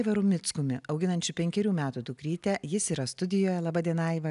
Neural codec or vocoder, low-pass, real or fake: none; 10.8 kHz; real